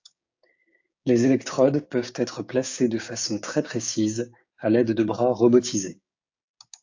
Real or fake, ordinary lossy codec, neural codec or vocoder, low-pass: fake; AAC, 48 kbps; codec, 16 kHz, 6 kbps, DAC; 7.2 kHz